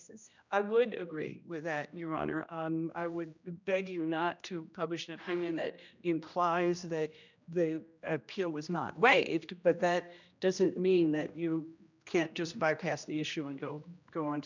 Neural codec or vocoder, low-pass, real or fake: codec, 16 kHz, 1 kbps, X-Codec, HuBERT features, trained on general audio; 7.2 kHz; fake